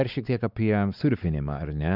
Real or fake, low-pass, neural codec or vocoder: fake; 5.4 kHz; codec, 16 kHz, 4 kbps, X-Codec, WavLM features, trained on Multilingual LibriSpeech